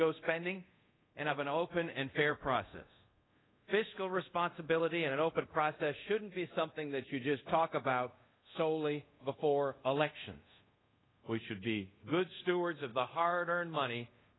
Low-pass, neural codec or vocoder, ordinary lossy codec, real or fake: 7.2 kHz; codec, 24 kHz, 0.5 kbps, DualCodec; AAC, 16 kbps; fake